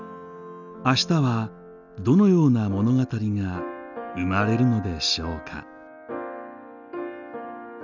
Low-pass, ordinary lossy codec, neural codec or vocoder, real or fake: 7.2 kHz; none; none; real